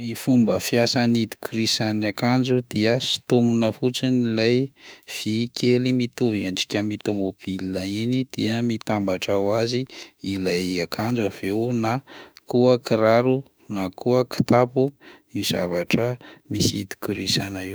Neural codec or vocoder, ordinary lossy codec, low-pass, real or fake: autoencoder, 48 kHz, 32 numbers a frame, DAC-VAE, trained on Japanese speech; none; none; fake